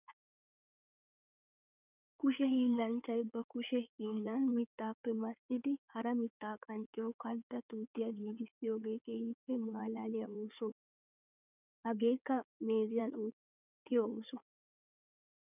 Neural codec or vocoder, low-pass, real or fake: codec, 16 kHz in and 24 kHz out, 2.2 kbps, FireRedTTS-2 codec; 3.6 kHz; fake